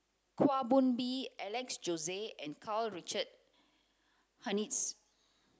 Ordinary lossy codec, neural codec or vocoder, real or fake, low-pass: none; none; real; none